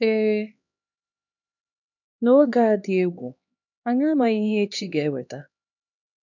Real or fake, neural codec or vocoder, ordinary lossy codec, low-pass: fake; codec, 16 kHz, 2 kbps, X-Codec, HuBERT features, trained on LibriSpeech; none; 7.2 kHz